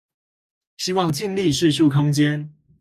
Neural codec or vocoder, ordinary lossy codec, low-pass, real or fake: codec, 44.1 kHz, 2.6 kbps, DAC; AAC, 96 kbps; 14.4 kHz; fake